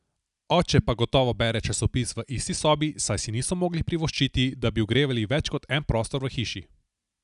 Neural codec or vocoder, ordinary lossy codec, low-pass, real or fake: none; none; 10.8 kHz; real